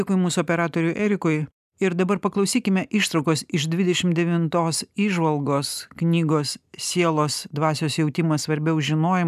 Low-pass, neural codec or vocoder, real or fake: 14.4 kHz; none; real